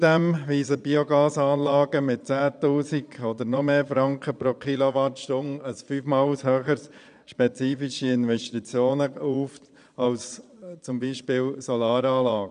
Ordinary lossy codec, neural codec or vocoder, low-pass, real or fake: none; vocoder, 22.05 kHz, 80 mel bands, Vocos; 9.9 kHz; fake